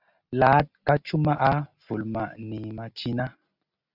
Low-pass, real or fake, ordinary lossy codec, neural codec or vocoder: 5.4 kHz; real; Opus, 64 kbps; none